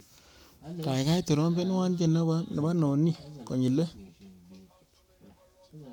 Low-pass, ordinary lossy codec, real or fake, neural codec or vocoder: 19.8 kHz; none; fake; codec, 44.1 kHz, 7.8 kbps, Pupu-Codec